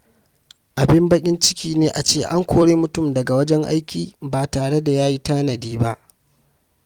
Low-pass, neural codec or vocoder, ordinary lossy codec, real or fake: 19.8 kHz; vocoder, 44.1 kHz, 128 mel bands every 256 samples, BigVGAN v2; Opus, 32 kbps; fake